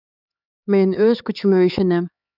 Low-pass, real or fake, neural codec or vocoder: 5.4 kHz; fake; codec, 16 kHz, 4 kbps, X-Codec, HuBERT features, trained on LibriSpeech